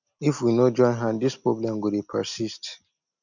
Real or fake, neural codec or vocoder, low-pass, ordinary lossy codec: real; none; 7.2 kHz; none